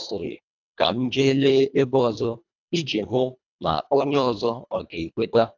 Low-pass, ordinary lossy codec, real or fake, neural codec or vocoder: 7.2 kHz; none; fake; codec, 24 kHz, 1.5 kbps, HILCodec